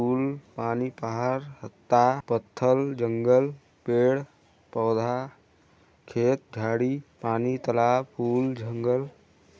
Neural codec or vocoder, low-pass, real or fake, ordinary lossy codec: none; none; real; none